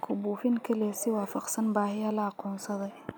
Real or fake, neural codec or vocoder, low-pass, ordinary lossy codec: real; none; none; none